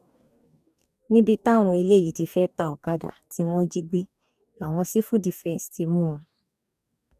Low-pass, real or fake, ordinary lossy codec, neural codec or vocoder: 14.4 kHz; fake; none; codec, 44.1 kHz, 2.6 kbps, DAC